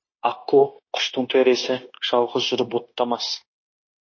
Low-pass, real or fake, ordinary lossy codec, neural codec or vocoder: 7.2 kHz; fake; MP3, 32 kbps; codec, 16 kHz, 0.9 kbps, LongCat-Audio-Codec